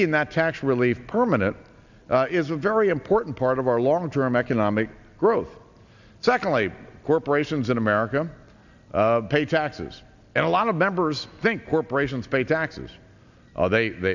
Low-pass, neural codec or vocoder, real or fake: 7.2 kHz; none; real